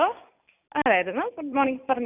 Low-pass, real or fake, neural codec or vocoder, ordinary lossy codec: 3.6 kHz; real; none; none